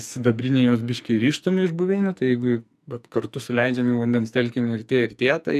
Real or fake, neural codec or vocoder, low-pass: fake; codec, 44.1 kHz, 2.6 kbps, SNAC; 14.4 kHz